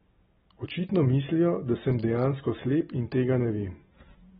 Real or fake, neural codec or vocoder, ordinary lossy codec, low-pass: real; none; AAC, 16 kbps; 7.2 kHz